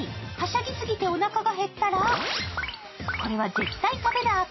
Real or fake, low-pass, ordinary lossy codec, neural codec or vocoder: real; 7.2 kHz; MP3, 24 kbps; none